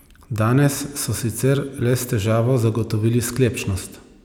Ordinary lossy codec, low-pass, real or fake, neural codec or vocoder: none; none; real; none